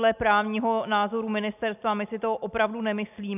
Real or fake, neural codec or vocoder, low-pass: real; none; 3.6 kHz